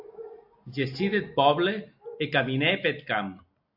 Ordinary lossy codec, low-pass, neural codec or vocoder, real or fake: AAC, 48 kbps; 5.4 kHz; none; real